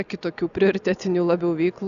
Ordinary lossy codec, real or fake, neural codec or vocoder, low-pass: Opus, 64 kbps; real; none; 7.2 kHz